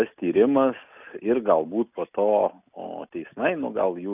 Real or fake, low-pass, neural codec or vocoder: real; 3.6 kHz; none